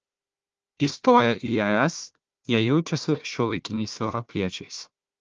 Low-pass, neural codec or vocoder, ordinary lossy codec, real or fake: 7.2 kHz; codec, 16 kHz, 1 kbps, FunCodec, trained on Chinese and English, 50 frames a second; Opus, 24 kbps; fake